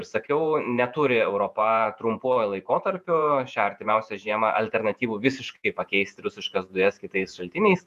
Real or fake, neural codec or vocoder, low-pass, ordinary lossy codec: fake; vocoder, 48 kHz, 128 mel bands, Vocos; 14.4 kHz; MP3, 96 kbps